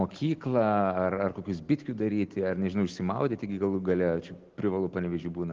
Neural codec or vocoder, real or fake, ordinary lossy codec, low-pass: none; real; Opus, 16 kbps; 7.2 kHz